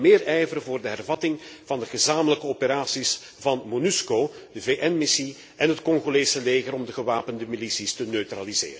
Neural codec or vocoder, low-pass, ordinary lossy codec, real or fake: none; none; none; real